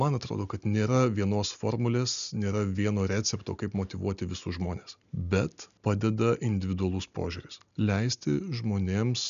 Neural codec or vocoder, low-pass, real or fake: none; 7.2 kHz; real